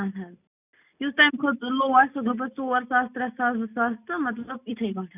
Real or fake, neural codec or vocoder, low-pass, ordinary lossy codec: real; none; 3.6 kHz; none